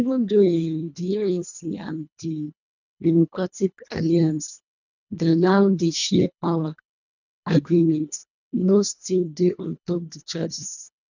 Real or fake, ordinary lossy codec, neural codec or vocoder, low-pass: fake; none; codec, 24 kHz, 1.5 kbps, HILCodec; 7.2 kHz